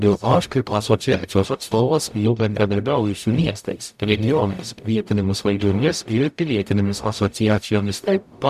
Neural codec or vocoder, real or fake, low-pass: codec, 44.1 kHz, 0.9 kbps, DAC; fake; 14.4 kHz